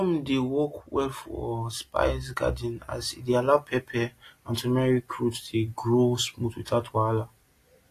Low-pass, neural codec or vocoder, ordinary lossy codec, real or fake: 14.4 kHz; none; AAC, 48 kbps; real